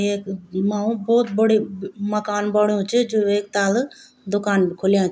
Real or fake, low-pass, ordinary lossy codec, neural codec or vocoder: real; none; none; none